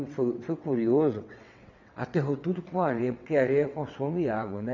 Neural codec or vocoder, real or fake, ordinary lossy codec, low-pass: vocoder, 22.05 kHz, 80 mel bands, WaveNeXt; fake; none; 7.2 kHz